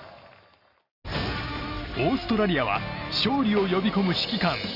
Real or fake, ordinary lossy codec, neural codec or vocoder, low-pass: real; none; none; 5.4 kHz